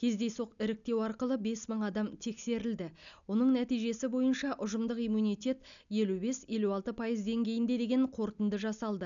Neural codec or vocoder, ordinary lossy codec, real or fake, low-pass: none; none; real; 7.2 kHz